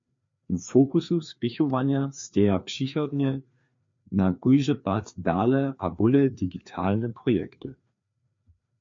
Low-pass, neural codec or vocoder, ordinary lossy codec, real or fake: 7.2 kHz; codec, 16 kHz, 2 kbps, FreqCodec, larger model; MP3, 48 kbps; fake